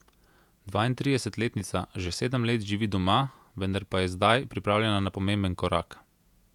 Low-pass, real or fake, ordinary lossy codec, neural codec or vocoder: 19.8 kHz; fake; none; vocoder, 48 kHz, 128 mel bands, Vocos